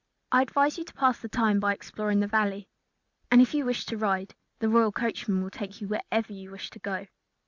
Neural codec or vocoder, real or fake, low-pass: none; real; 7.2 kHz